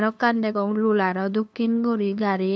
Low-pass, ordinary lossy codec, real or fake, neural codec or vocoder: none; none; fake; codec, 16 kHz, 2 kbps, FunCodec, trained on LibriTTS, 25 frames a second